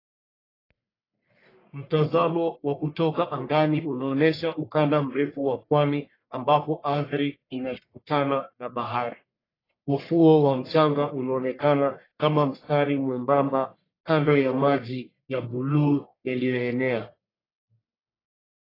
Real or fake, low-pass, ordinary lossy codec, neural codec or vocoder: fake; 5.4 kHz; AAC, 24 kbps; codec, 44.1 kHz, 1.7 kbps, Pupu-Codec